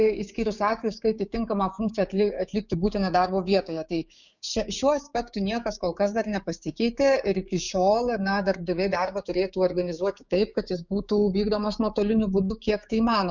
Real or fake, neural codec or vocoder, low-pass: fake; codec, 16 kHz, 6 kbps, DAC; 7.2 kHz